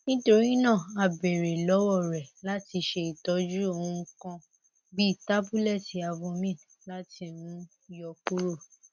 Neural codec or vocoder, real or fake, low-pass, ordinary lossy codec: none; real; 7.2 kHz; none